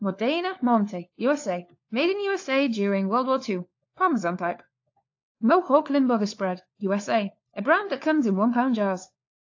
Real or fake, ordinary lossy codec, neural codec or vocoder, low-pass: fake; AAC, 48 kbps; codec, 16 kHz, 4 kbps, FunCodec, trained on LibriTTS, 50 frames a second; 7.2 kHz